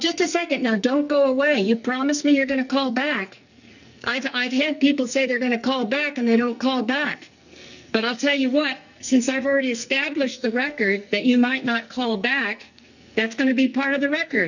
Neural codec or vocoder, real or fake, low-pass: codec, 44.1 kHz, 2.6 kbps, SNAC; fake; 7.2 kHz